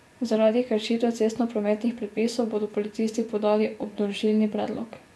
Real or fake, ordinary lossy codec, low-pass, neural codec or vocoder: fake; none; none; vocoder, 24 kHz, 100 mel bands, Vocos